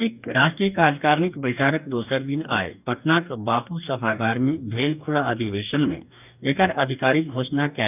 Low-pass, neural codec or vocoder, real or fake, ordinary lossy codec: 3.6 kHz; codec, 44.1 kHz, 2.6 kbps, DAC; fake; none